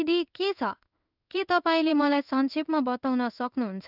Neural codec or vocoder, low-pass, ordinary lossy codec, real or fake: codec, 16 kHz in and 24 kHz out, 1 kbps, XY-Tokenizer; 5.4 kHz; none; fake